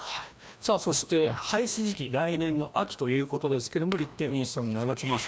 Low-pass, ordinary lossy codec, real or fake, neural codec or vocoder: none; none; fake; codec, 16 kHz, 1 kbps, FreqCodec, larger model